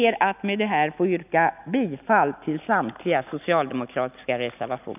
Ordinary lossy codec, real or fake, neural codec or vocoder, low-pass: none; real; none; 3.6 kHz